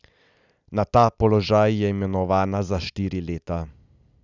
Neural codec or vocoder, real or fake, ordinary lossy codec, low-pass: none; real; none; 7.2 kHz